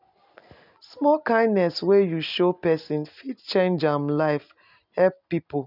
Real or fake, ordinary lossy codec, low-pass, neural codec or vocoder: real; none; 5.4 kHz; none